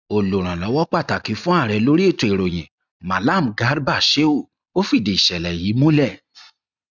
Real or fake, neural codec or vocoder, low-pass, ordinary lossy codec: real; none; 7.2 kHz; none